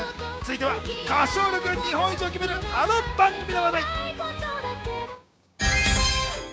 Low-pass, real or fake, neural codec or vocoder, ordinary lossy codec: none; fake; codec, 16 kHz, 6 kbps, DAC; none